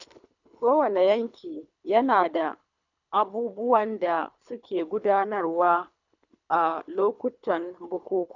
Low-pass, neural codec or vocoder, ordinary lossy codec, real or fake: 7.2 kHz; codec, 24 kHz, 3 kbps, HILCodec; none; fake